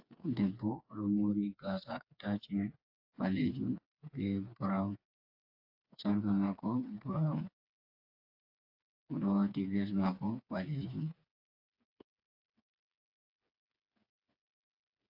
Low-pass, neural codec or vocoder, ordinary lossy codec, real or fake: 5.4 kHz; codec, 16 kHz, 4 kbps, FreqCodec, smaller model; AAC, 48 kbps; fake